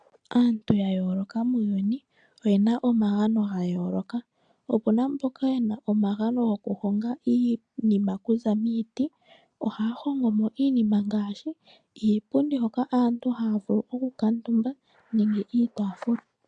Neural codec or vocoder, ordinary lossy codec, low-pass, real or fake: none; AAC, 64 kbps; 9.9 kHz; real